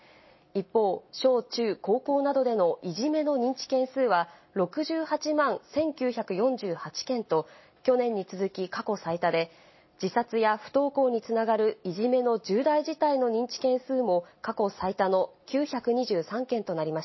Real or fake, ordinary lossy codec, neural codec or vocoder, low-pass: real; MP3, 24 kbps; none; 7.2 kHz